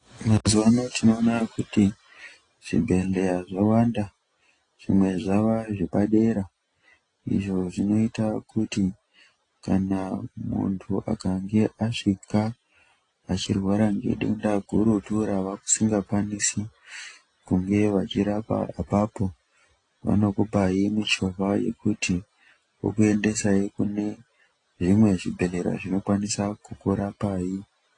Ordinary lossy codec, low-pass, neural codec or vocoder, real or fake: AAC, 32 kbps; 9.9 kHz; none; real